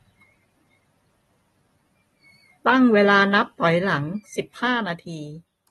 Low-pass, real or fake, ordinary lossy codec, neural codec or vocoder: 19.8 kHz; real; AAC, 32 kbps; none